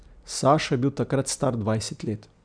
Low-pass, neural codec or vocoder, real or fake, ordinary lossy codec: 9.9 kHz; none; real; none